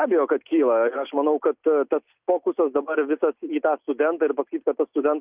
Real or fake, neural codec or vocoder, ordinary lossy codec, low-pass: real; none; Opus, 24 kbps; 3.6 kHz